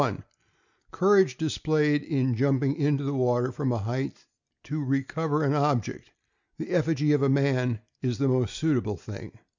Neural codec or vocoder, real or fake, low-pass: none; real; 7.2 kHz